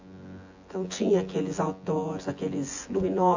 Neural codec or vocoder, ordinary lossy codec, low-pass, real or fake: vocoder, 24 kHz, 100 mel bands, Vocos; AAC, 48 kbps; 7.2 kHz; fake